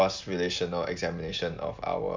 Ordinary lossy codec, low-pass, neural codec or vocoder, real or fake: MP3, 64 kbps; 7.2 kHz; none; real